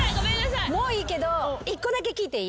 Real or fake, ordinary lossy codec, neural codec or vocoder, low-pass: real; none; none; none